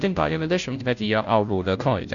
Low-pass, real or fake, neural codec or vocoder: 7.2 kHz; fake; codec, 16 kHz, 0.5 kbps, FunCodec, trained on Chinese and English, 25 frames a second